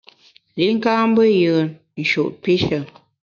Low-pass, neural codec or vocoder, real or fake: 7.2 kHz; autoencoder, 48 kHz, 128 numbers a frame, DAC-VAE, trained on Japanese speech; fake